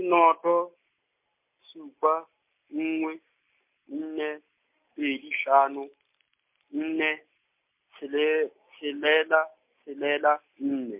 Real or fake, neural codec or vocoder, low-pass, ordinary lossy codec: real; none; 3.6 kHz; MP3, 32 kbps